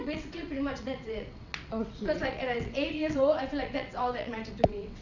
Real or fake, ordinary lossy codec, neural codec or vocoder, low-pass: fake; none; vocoder, 22.05 kHz, 80 mel bands, WaveNeXt; 7.2 kHz